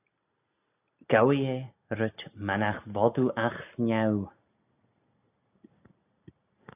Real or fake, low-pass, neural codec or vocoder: real; 3.6 kHz; none